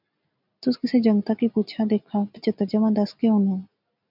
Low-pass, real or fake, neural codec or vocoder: 5.4 kHz; real; none